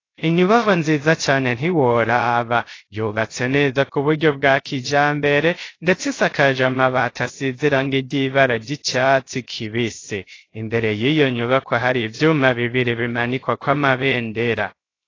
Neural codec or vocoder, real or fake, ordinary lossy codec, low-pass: codec, 16 kHz, 0.3 kbps, FocalCodec; fake; AAC, 32 kbps; 7.2 kHz